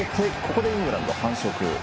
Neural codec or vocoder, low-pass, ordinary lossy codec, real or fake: none; none; none; real